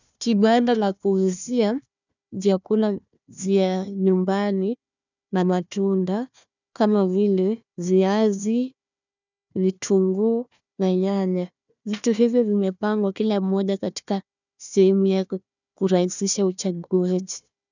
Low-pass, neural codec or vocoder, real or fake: 7.2 kHz; codec, 16 kHz, 1 kbps, FunCodec, trained on Chinese and English, 50 frames a second; fake